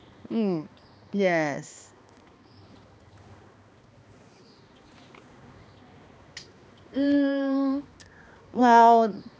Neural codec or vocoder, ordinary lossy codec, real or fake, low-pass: codec, 16 kHz, 2 kbps, X-Codec, HuBERT features, trained on balanced general audio; none; fake; none